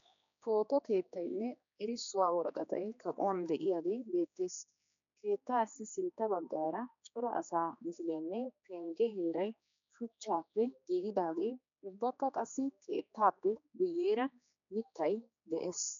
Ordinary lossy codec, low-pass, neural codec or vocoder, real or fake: MP3, 96 kbps; 7.2 kHz; codec, 16 kHz, 2 kbps, X-Codec, HuBERT features, trained on general audio; fake